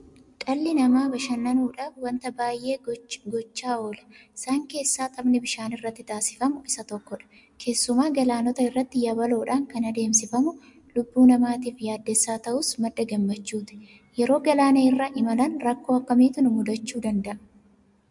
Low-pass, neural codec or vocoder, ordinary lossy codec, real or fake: 10.8 kHz; none; MP3, 64 kbps; real